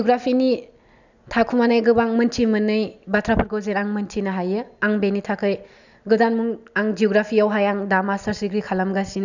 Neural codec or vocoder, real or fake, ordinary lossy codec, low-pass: none; real; none; 7.2 kHz